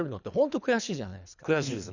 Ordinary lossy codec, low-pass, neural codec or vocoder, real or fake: none; 7.2 kHz; codec, 24 kHz, 3 kbps, HILCodec; fake